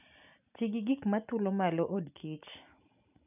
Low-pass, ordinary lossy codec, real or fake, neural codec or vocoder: 3.6 kHz; none; real; none